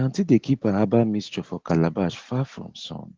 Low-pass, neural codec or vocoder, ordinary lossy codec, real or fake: 7.2 kHz; none; Opus, 16 kbps; real